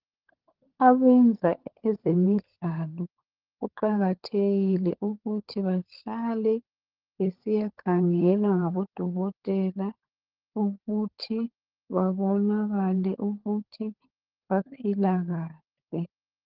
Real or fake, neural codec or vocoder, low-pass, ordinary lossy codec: fake; codec, 16 kHz, 16 kbps, FunCodec, trained on LibriTTS, 50 frames a second; 5.4 kHz; Opus, 16 kbps